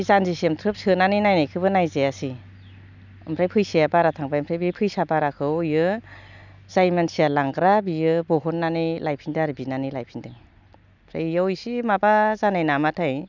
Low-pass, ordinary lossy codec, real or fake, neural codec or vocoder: 7.2 kHz; none; real; none